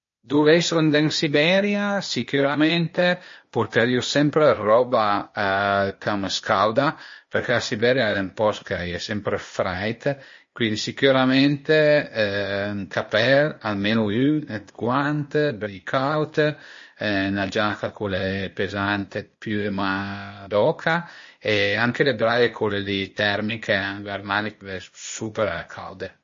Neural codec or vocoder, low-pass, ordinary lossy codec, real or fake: codec, 16 kHz, 0.8 kbps, ZipCodec; 7.2 kHz; MP3, 32 kbps; fake